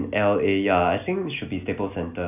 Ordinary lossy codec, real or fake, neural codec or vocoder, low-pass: none; real; none; 3.6 kHz